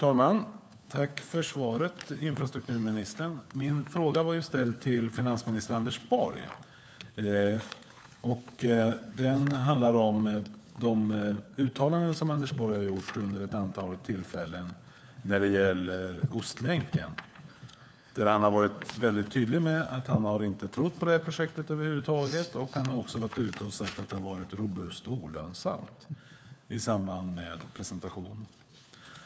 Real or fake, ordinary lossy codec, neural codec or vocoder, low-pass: fake; none; codec, 16 kHz, 4 kbps, FunCodec, trained on LibriTTS, 50 frames a second; none